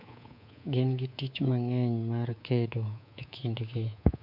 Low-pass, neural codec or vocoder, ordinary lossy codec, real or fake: 5.4 kHz; codec, 24 kHz, 3.1 kbps, DualCodec; none; fake